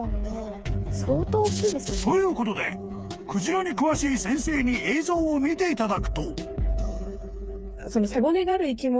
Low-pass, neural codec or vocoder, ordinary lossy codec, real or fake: none; codec, 16 kHz, 4 kbps, FreqCodec, smaller model; none; fake